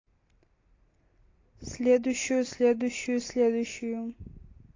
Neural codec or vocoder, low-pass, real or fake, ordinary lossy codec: none; 7.2 kHz; real; AAC, 32 kbps